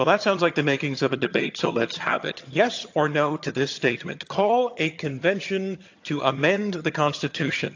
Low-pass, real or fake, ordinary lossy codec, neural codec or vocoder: 7.2 kHz; fake; AAC, 48 kbps; vocoder, 22.05 kHz, 80 mel bands, HiFi-GAN